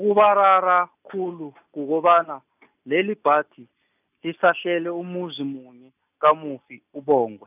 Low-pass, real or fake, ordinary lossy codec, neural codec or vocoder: 3.6 kHz; real; none; none